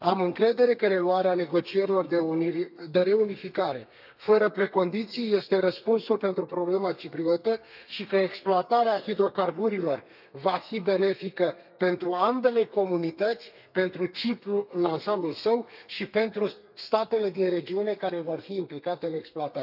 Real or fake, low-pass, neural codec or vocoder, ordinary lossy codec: fake; 5.4 kHz; codec, 32 kHz, 1.9 kbps, SNAC; none